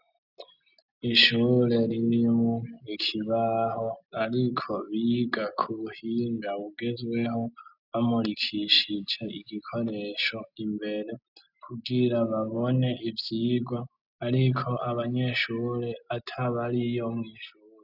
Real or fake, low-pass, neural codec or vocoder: real; 5.4 kHz; none